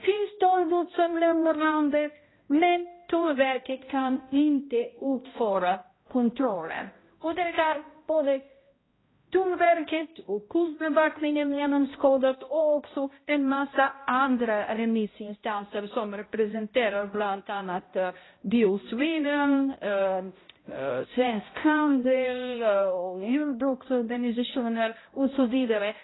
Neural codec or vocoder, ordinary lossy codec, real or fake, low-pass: codec, 16 kHz, 0.5 kbps, X-Codec, HuBERT features, trained on balanced general audio; AAC, 16 kbps; fake; 7.2 kHz